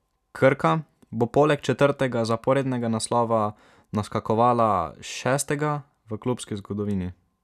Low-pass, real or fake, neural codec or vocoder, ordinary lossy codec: 14.4 kHz; real; none; none